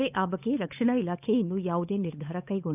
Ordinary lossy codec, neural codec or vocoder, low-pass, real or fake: none; codec, 16 kHz, 8 kbps, FunCodec, trained on Chinese and English, 25 frames a second; 3.6 kHz; fake